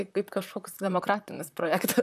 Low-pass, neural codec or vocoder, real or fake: 14.4 kHz; vocoder, 44.1 kHz, 128 mel bands, Pupu-Vocoder; fake